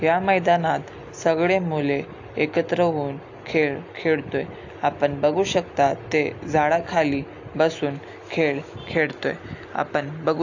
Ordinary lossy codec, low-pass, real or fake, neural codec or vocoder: AAC, 48 kbps; 7.2 kHz; real; none